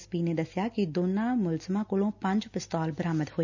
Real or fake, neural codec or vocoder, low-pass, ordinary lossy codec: real; none; 7.2 kHz; none